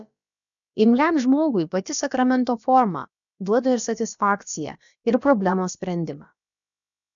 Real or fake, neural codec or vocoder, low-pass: fake; codec, 16 kHz, about 1 kbps, DyCAST, with the encoder's durations; 7.2 kHz